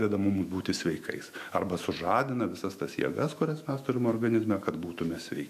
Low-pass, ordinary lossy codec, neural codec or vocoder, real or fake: 14.4 kHz; AAC, 96 kbps; autoencoder, 48 kHz, 128 numbers a frame, DAC-VAE, trained on Japanese speech; fake